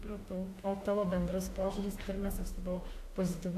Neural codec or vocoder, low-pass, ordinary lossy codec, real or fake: autoencoder, 48 kHz, 32 numbers a frame, DAC-VAE, trained on Japanese speech; 14.4 kHz; AAC, 64 kbps; fake